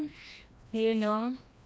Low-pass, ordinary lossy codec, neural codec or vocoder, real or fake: none; none; codec, 16 kHz, 1 kbps, FreqCodec, larger model; fake